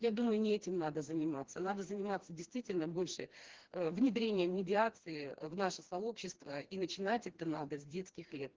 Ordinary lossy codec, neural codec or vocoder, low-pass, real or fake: Opus, 16 kbps; codec, 16 kHz, 2 kbps, FreqCodec, smaller model; 7.2 kHz; fake